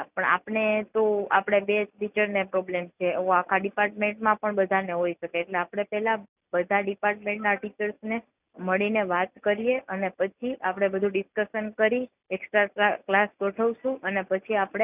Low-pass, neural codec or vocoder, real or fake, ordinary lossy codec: 3.6 kHz; none; real; none